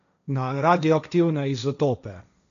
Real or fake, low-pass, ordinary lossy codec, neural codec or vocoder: fake; 7.2 kHz; none; codec, 16 kHz, 1.1 kbps, Voila-Tokenizer